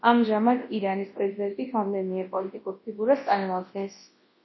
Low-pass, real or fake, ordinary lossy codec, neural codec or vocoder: 7.2 kHz; fake; MP3, 24 kbps; codec, 24 kHz, 0.9 kbps, WavTokenizer, large speech release